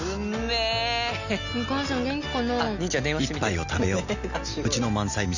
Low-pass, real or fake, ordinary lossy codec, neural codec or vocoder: 7.2 kHz; real; none; none